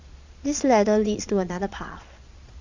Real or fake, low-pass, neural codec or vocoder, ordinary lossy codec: fake; 7.2 kHz; vocoder, 22.05 kHz, 80 mel bands, Vocos; Opus, 64 kbps